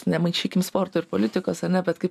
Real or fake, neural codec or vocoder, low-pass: real; none; 14.4 kHz